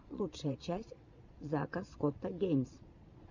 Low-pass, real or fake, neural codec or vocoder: 7.2 kHz; fake; vocoder, 22.05 kHz, 80 mel bands, Vocos